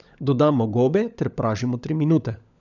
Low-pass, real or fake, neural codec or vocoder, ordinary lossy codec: 7.2 kHz; fake; codec, 16 kHz, 16 kbps, FunCodec, trained on LibriTTS, 50 frames a second; none